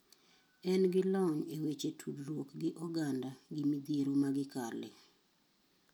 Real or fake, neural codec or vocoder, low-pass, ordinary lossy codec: real; none; none; none